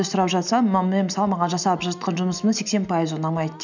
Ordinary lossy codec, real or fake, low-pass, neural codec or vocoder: none; real; 7.2 kHz; none